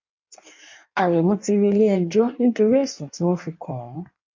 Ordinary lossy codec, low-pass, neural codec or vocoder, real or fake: MP3, 64 kbps; 7.2 kHz; codec, 16 kHz in and 24 kHz out, 1.1 kbps, FireRedTTS-2 codec; fake